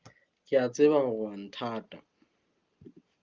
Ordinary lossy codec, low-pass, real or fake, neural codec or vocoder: Opus, 24 kbps; 7.2 kHz; real; none